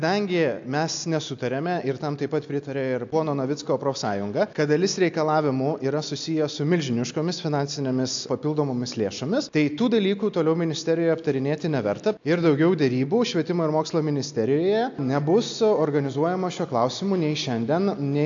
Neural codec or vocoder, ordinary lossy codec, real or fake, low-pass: none; AAC, 64 kbps; real; 7.2 kHz